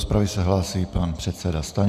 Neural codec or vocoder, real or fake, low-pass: none; real; 14.4 kHz